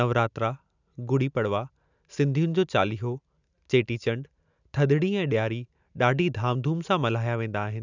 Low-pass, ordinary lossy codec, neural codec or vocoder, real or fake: 7.2 kHz; none; none; real